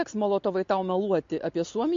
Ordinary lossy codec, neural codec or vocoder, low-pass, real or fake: MP3, 48 kbps; none; 7.2 kHz; real